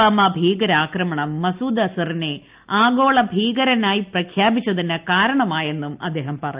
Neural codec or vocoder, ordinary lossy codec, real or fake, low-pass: none; Opus, 24 kbps; real; 3.6 kHz